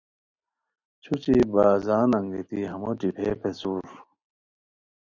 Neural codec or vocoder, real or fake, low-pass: none; real; 7.2 kHz